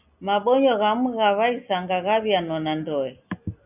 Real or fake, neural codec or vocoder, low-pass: real; none; 3.6 kHz